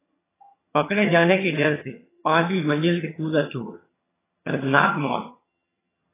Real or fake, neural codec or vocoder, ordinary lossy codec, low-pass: fake; vocoder, 22.05 kHz, 80 mel bands, HiFi-GAN; AAC, 16 kbps; 3.6 kHz